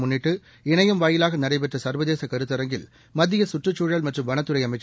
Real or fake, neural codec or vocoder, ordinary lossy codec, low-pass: real; none; none; none